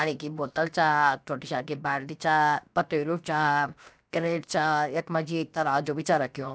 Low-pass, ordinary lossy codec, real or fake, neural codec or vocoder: none; none; fake; codec, 16 kHz, 0.7 kbps, FocalCodec